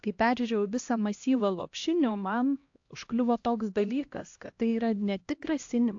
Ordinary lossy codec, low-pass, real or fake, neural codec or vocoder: AAC, 48 kbps; 7.2 kHz; fake; codec, 16 kHz, 1 kbps, X-Codec, HuBERT features, trained on LibriSpeech